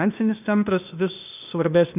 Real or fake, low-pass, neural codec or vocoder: fake; 3.6 kHz; codec, 16 kHz, 0.8 kbps, ZipCodec